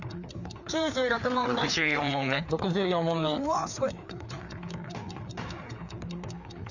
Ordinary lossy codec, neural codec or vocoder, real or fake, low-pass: none; codec, 16 kHz, 4 kbps, FreqCodec, larger model; fake; 7.2 kHz